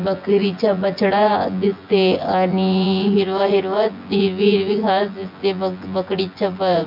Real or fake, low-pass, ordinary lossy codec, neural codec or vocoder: fake; 5.4 kHz; MP3, 48 kbps; vocoder, 24 kHz, 100 mel bands, Vocos